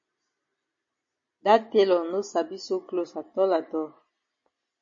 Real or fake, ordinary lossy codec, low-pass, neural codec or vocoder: real; MP3, 32 kbps; 7.2 kHz; none